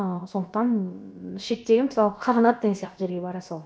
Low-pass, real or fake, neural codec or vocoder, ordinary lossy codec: none; fake; codec, 16 kHz, about 1 kbps, DyCAST, with the encoder's durations; none